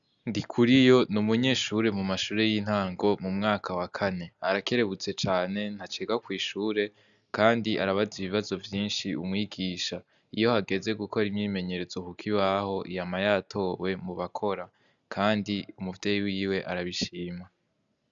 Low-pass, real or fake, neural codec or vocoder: 7.2 kHz; real; none